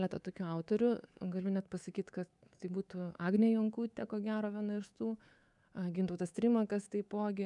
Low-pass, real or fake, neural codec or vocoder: 10.8 kHz; fake; codec, 24 kHz, 3.1 kbps, DualCodec